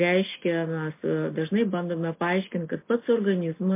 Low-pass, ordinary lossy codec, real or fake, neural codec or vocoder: 3.6 kHz; MP3, 24 kbps; real; none